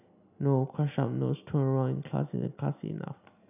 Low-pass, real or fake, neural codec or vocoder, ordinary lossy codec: 3.6 kHz; real; none; none